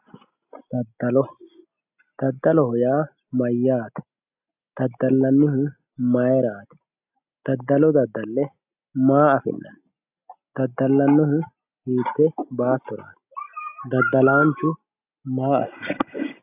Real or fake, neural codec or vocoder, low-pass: real; none; 3.6 kHz